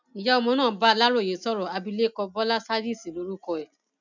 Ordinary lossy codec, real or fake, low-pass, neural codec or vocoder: none; real; 7.2 kHz; none